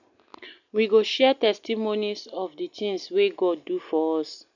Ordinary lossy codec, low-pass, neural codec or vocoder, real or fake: none; 7.2 kHz; none; real